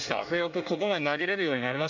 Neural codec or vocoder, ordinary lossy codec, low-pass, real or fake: codec, 24 kHz, 1 kbps, SNAC; MP3, 48 kbps; 7.2 kHz; fake